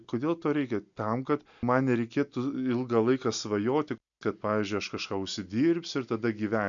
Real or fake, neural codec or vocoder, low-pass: real; none; 7.2 kHz